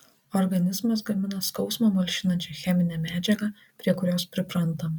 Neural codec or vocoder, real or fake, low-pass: none; real; 19.8 kHz